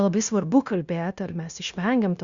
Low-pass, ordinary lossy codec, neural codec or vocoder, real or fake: 7.2 kHz; Opus, 64 kbps; codec, 16 kHz, 0.9 kbps, LongCat-Audio-Codec; fake